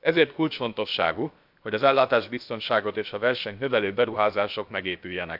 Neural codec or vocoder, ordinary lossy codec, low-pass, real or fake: codec, 16 kHz, about 1 kbps, DyCAST, with the encoder's durations; AAC, 48 kbps; 5.4 kHz; fake